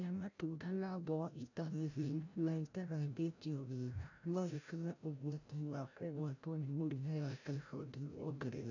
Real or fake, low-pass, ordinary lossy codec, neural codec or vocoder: fake; 7.2 kHz; none; codec, 16 kHz, 0.5 kbps, FreqCodec, larger model